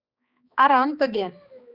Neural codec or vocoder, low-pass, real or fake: codec, 16 kHz, 2 kbps, X-Codec, HuBERT features, trained on balanced general audio; 5.4 kHz; fake